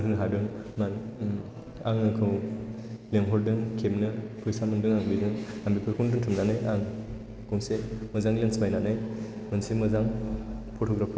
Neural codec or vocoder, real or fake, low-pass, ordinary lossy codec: none; real; none; none